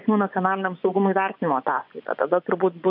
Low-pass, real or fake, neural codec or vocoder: 5.4 kHz; fake; vocoder, 24 kHz, 100 mel bands, Vocos